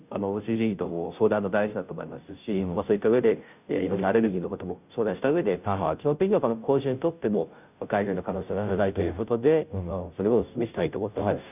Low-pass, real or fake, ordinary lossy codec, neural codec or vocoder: 3.6 kHz; fake; none; codec, 16 kHz, 0.5 kbps, FunCodec, trained on Chinese and English, 25 frames a second